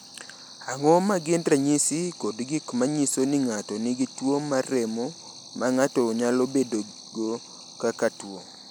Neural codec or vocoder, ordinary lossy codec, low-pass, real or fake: none; none; none; real